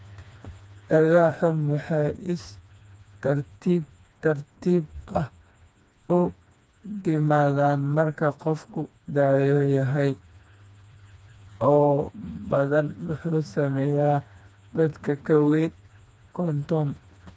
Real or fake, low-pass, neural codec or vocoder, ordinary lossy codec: fake; none; codec, 16 kHz, 2 kbps, FreqCodec, smaller model; none